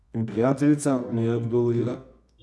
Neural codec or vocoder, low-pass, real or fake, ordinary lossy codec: codec, 24 kHz, 0.9 kbps, WavTokenizer, medium music audio release; none; fake; none